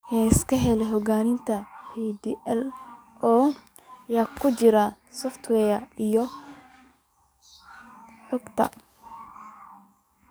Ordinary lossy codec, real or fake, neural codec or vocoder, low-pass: none; fake; codec, 44.1 kHz, 7.8 kbps, DAC; none